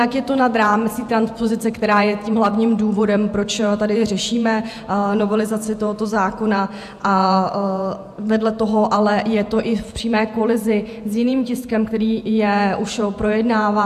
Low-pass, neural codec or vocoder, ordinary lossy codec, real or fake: 14.4 kHz; vocoder, 48 kHz, 128 mel bands, Vocos; AAC, 96 kbps; fake